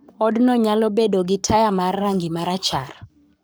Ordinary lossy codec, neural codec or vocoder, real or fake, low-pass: none; codec, 44.1 kHz, 7.8 kbps, Pupu-Codec; fake; none